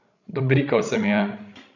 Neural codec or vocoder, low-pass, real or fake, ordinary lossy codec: codec, 16 kHz, 16 kbps, FreqCodec, larger model; 7.2 kHz; fake; none